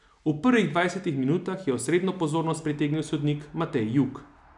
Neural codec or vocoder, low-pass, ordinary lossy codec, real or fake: none; 10.8 kHz; none; real